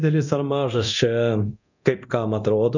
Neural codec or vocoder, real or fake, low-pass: codec, 24 kHz, 0.9 kbps, DualCodec; fake; 7.2 kHz